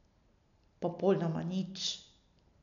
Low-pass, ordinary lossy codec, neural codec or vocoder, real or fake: 7.2 kHz; none; none; real